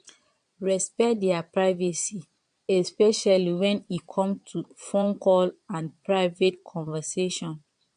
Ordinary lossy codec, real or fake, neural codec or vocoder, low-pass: MP3, 64 kbps; fake; vocoder, 22.05 kHz, 80 mel bands, Vocos; 9.9 kHz